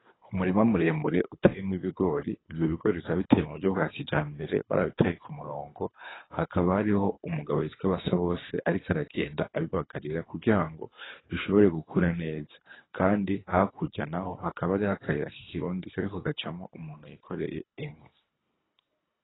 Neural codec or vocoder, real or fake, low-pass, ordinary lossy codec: codec, 24 kHz, 3 kbps, HILCodec; fake; 7.2 kHz; AAC, 16 kbps